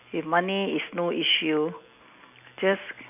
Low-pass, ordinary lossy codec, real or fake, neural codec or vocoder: 3.6 kHz; none; real; none